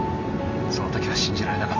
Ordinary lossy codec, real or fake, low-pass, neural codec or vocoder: none; real; 7.2 kHz; none